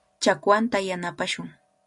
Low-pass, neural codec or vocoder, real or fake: 10.8 kHz; none; real